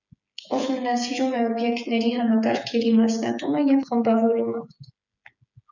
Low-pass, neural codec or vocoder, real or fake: 7.2 kHz; codec, 16 kHz, 8 kbps, FreqCodec, smaller model; fake